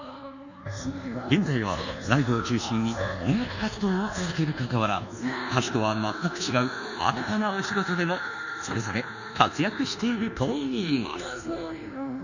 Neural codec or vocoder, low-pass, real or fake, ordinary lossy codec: codec, 24 kHz, 1.2 kbps, DualCodec; 7.2 kHz; fake; none